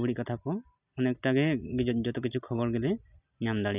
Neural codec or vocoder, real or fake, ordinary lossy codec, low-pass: none; real; none; 3.6 kHz